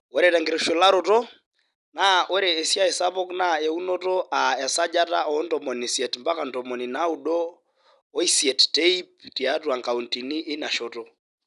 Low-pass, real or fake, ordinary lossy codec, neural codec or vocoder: 10.8 kHz; real; none; none